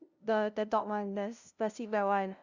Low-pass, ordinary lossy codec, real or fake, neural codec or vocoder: 7.2 kHz; Opus, 64 kbps; fake; codec, 16 kHz, 0.5 kbps, FunCodec, trained on LibriTTS, 25 frames a second